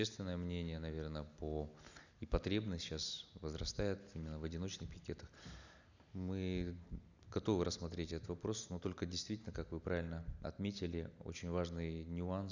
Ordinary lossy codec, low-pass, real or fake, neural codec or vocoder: none; 7.2 kHz; real; none